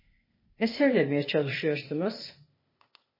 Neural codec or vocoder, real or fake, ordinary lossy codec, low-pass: codec, 16 kHz, 0.8 kbps, ZipCodec; fake; MP3, 24 kbps; 5.4 kHz